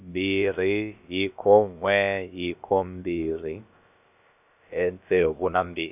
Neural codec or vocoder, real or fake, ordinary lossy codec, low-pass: codec, 16 kHz, about 1 kbps, DyCAST, with the encoder's durations; fake; none; 3.6 kHz